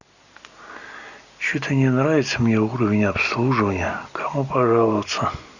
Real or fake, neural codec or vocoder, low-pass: real; none; 7.2 kHz